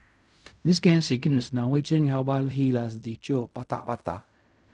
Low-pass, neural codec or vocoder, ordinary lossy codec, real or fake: 10.8 kHz; codec, 16 kHz in and 24 kHz out, 0.4 kbps, LongCat-Audio-Codec, fine tuned four codebook decoder; none; fake